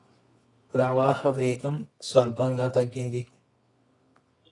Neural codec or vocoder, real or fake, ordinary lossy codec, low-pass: codec, 24 kHz, 0.9 kbps, WavTokenizer, medium music audio release; fake; AAC, 32 kbps; 10.8 kHz